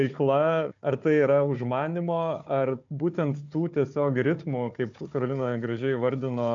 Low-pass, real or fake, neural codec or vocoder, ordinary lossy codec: 7.2 kHz; fake; codec, 16 kHz, 4 kbps, FunCodec, trained on Chinese and English, 50 frames a second; AAC, 64 kbps